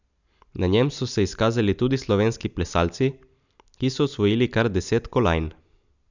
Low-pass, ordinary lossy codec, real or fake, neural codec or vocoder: 7.2 kHz; none; real; none